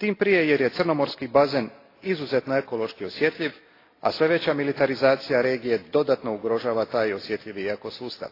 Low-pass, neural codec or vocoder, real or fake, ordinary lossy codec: 5.4 kHz; none; real; AAC, 24 kbps